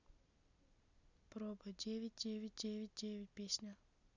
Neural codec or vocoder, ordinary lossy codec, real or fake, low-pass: none; none; real; 7.2 kHz